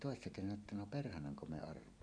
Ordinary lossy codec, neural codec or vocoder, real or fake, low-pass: none; none; real; 9.9 kHz